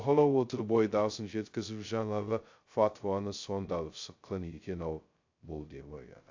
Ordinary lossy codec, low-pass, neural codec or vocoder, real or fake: AAC, 48 kbps; 7.2 kHz; codec, 16 kHz, 0.2 kbps, FocalCodec; fake